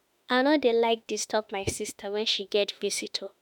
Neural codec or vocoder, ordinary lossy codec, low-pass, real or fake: autoencoder, 48 kHz, 32 numbers a frame, DAC-VAE, trained on Japanese speech; none; 19.8 kHz; fake